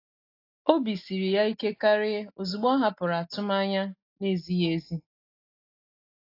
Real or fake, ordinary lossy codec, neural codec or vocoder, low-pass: real; AAC, 32 kbps; none; 5.4 kHz